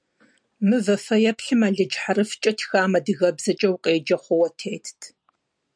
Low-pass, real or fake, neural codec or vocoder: 9.9 kHz; real; none